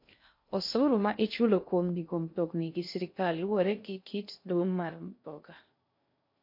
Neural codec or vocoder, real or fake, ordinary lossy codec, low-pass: codec, 16 kHz in and 24 kHz out, 0.6 kbps, FocalCodec, streaming, 2048 codes; fake; MP3, 32 kbps; 5.4 kHz